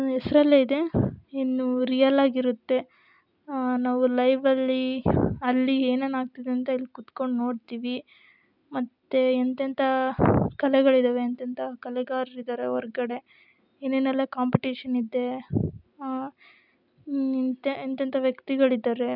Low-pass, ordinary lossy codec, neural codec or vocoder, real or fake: 5.4 kHz; none; none; real